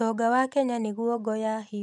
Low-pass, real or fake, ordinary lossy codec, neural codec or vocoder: none; real; none; none